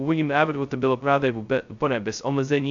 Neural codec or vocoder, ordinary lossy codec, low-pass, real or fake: codec, 16 kHz, 0.2 kbps, FocalCodec; AAC, 64 kbps; 7.2 kHz; fake